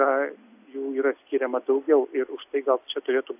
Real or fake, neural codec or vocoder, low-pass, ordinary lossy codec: real; none; 3.6 kHz; AAC, 32 kbps